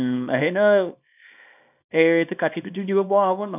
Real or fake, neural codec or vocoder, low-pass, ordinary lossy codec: fake; codec, 24 kHz, 0.9 kbps, WavTokenizer, small release; 3.6 kHz; none